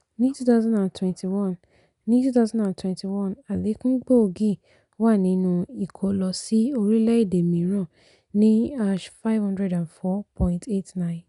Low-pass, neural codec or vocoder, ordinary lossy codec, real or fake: 10.8 kHz; none; none; real